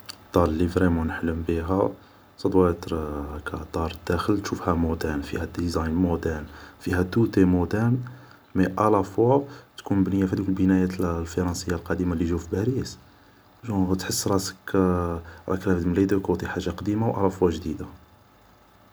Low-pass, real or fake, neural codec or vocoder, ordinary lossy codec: none; real; none; none